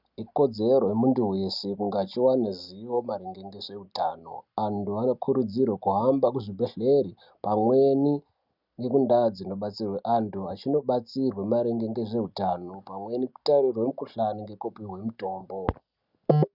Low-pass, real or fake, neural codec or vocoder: 5.4 kHz; real; none